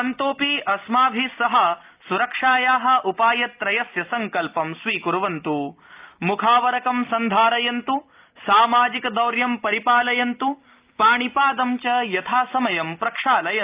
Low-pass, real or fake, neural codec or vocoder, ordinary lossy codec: 3.6 kHz; real; none; Opus, 24 kbps